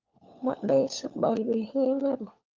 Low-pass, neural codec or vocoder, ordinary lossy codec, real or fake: 7.2 kHz; codec, 16 kHz, 4 kbps, FunCodec, trained on LibriTTS, 50 frames a second; Opus, 24 kbps; fake